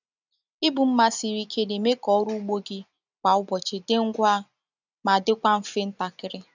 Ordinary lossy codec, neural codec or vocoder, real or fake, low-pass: none; none; real; 7.2 kHz